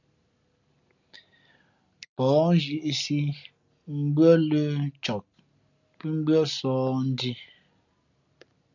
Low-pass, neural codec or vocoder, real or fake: 7.2 kHz; none; real